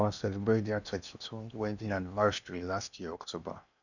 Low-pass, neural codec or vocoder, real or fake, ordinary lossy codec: 7.2 kHz; codec, 16 kHz in and 24 kHz out, 0.8 kbps, FocalCodec, streaming, 65536 codes; fake; none